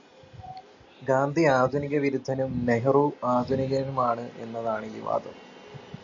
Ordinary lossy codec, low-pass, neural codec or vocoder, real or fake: MP3, 64 kbps; 7.2 kHz; none; real